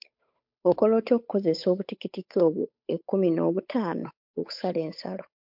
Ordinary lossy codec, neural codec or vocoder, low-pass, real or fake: MP3, 48 kbps; codec, 16 kHz, 8 kbps, FunCodec, trained on Chinese and English, 25 frames a second; 5.4 kHz; fake